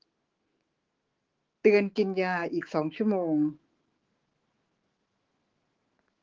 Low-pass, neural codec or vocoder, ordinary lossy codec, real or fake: 7.2 kHz; autoencoder, 48 kHz, 128 numbers a frame, DAC-VAE, trained on Japanese speech; Opus, 16 kbps; fake